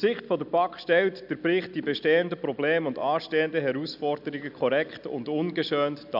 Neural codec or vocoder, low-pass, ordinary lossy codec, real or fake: none; 5.4 kHz; none; real